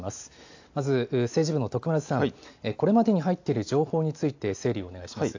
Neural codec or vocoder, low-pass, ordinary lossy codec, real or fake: none; 7.2 kHz; AAC, 48 kbps; real